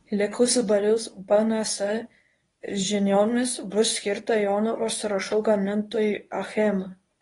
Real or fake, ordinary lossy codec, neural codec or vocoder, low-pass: fake; AAC, 32 kbps; codec, 24 kHz, 0.9 kbps, WavTokenizer, medium speech release version 1; 10.8 kHz